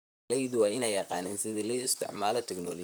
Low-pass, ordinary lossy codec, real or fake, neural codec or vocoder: none; none; fake; vocoder, 44.1 kHz, 128 mel bands, Pupu-Vocoder